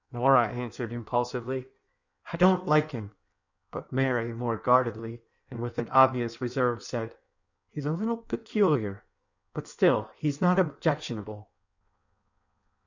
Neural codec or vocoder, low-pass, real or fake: codec, 16 kHz in and 24 kHz out, 1.1 kbps, FireRedTTS-2 codec; 7.2 kHz; fake